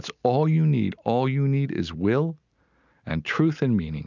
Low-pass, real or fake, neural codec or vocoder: 7.2 kHz; real; none